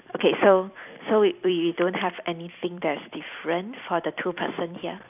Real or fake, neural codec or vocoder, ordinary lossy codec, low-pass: fake; vocoder, 44.1 kHz, 128 mel bands every 256 samples, BigVGAN v2; none; 3.6 kHz